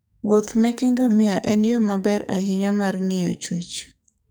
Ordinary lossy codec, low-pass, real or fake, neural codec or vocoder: none; none; fake; codec, 44.1 kHz, 2.6 kbps, SNAC